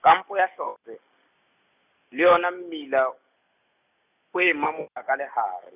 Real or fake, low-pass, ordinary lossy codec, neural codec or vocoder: real; 3.6 kHz; none; none